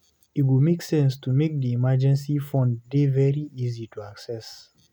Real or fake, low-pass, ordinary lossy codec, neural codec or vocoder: real; 19.8 kHz; none; none